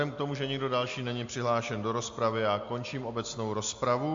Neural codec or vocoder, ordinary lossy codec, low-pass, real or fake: none; MP3, 48 kbps; 7.2 kHz; real